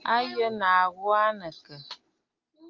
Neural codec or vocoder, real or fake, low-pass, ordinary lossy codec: none; real; 7.2 kHz; Opus, 32 kbps